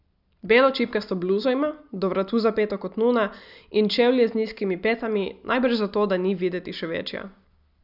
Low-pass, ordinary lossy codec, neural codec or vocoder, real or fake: 5.4 kHz; none; none; real